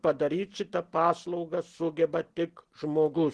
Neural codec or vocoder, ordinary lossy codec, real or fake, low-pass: none; Opus, 16 kbps; real; 10.8 kHz